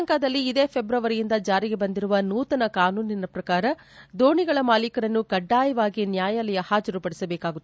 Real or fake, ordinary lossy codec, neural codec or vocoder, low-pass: real; none; none; none